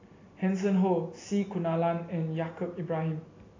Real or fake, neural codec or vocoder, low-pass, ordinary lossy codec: real; none; 7.2 kHz; AAC, 32 kbps